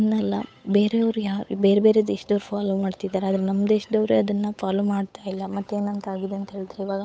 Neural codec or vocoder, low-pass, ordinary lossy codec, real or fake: codec, 16 kHz, 8 kbps, FunCodec, trained on Chinese and English, 25 frames a second; none; none; fake